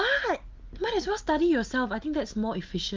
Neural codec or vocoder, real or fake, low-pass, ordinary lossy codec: none; real; 7.2 kHz; Opus, 24 kbps